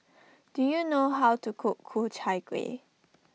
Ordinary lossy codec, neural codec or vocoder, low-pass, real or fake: none; none; none; real